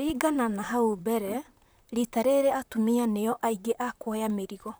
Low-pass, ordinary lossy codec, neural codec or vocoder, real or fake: none; none; vocoder, 44.1 kHz, 128 mel bands, Pupu-Vocoder; fake